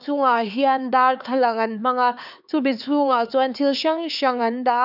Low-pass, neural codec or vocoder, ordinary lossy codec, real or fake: 5.4 kHz; codec, 16 kHz, 4 kbps, X-Codec, HuBERT features, trained on LibriSpeech; none; fake